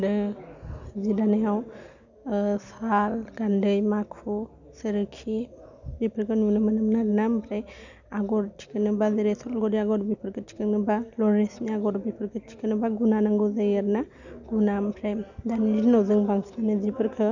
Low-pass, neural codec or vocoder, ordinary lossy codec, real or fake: 7.2 kHz; none; none; real